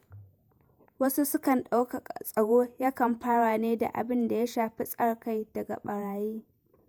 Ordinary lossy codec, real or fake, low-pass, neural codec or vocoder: none; fake; none; vocoder, 48 kHz, 128 mel bands, Vocos